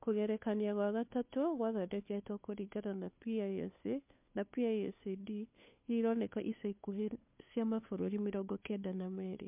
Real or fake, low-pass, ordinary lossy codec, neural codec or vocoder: fake; 3.6 kHz; MP3, 32 kbps; codec, 16 kHz, 2 kbps, FunCodec, trained on Chinese and English, 25 frames a second